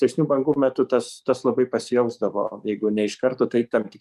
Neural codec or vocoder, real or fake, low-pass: codec, 44.1 kHz, 7.8 kbps, DAC; fake; 14.4 kHz